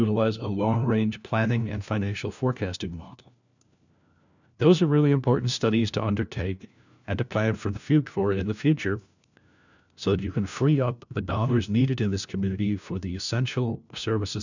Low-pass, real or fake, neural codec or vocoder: 7.2 kHz; fake; codec, 16 kHz, 1 kbps, FunCodec, trained on LibriTTS, 50 frames a second